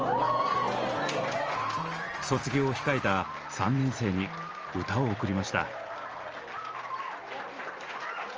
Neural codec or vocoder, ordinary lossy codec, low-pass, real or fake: none; Opus, 24 kbps; 7.2 kHz; real